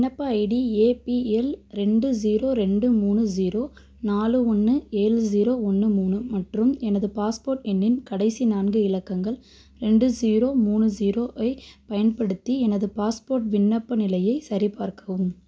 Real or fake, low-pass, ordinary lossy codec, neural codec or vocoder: real; none; none; none